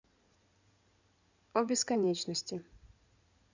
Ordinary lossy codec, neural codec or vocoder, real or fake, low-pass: none; codec, 16 kHz in and 24 kHz out, 2.2 kbps, FireRedTTS-2 codec; fake; 7.2 kHz